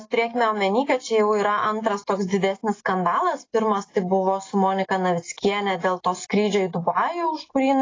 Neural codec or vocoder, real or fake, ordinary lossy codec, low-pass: none; real; AAC, 32 kbps; 7.2 kHz